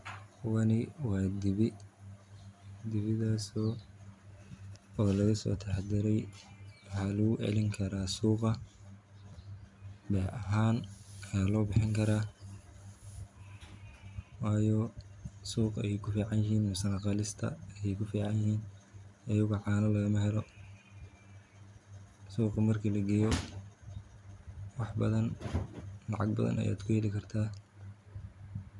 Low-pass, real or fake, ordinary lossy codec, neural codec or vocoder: 10.8 kHz; real; none; none